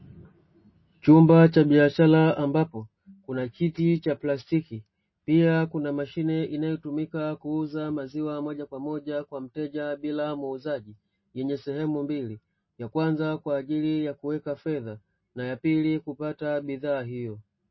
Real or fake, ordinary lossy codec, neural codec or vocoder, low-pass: real; MP3, 24 kbps; none; 7.2 kHz